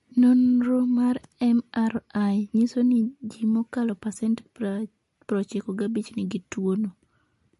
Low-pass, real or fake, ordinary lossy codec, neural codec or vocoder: 14.4 kHz; real; MP3, 48 kbps; none